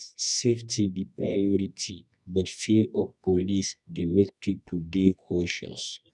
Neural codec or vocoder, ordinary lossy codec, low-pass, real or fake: codec, 24 kHz, 0.9 kbps, WavTokenizer, medium music audio release; none; 10.8 kHz; fake